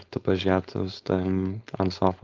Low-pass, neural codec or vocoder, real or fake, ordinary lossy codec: 7.2 kHz; codec, 16 kHz, 4.8 kbps, FACodec; fake; Opus, 32 kbps